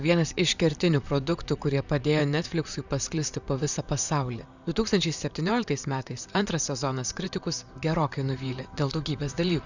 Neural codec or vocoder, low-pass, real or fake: vocoder, 44.1 kHz, 128 mel bands, Pupu-Vocoder; 7.2 kHz; fake